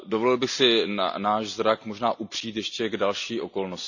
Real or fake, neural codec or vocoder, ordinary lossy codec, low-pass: real; none; none; 7.2 kHz